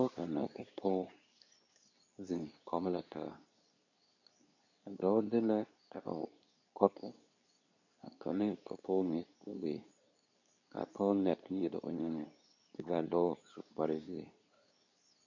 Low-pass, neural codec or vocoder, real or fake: 7.2 kHz; codec, 24 kHz, 0.9 kbps, WavTokenizer, medium speech release version 2; fake